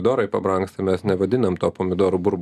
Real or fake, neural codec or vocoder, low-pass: real; none; 14.4 kHz